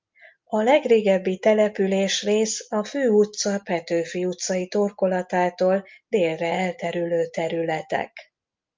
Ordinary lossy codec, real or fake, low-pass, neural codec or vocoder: Opus, 24 kbps; real; 7.2 kHz; none